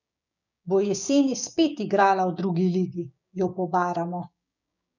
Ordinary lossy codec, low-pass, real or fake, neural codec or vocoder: none; 7.2 kHz; fake; codec, 16 kHz, 6 kbps, DAC